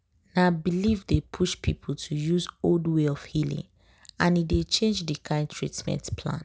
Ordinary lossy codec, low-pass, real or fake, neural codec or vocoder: none; none; real; none